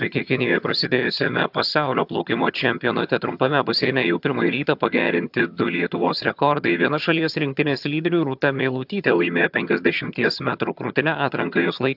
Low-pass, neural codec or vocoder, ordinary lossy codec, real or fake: 5.4 kHz; vocoder, 22.05 kHz, 80 mel bands, HiFi-GAN; AAC, 48 kbps; fake